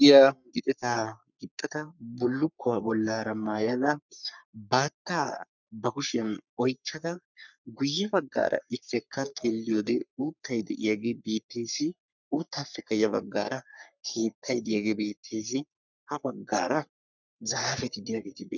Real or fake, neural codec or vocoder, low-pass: fake; codec, 44.1 kHz, 3.4 kbps, Pupu-Codec; 7.2 kHz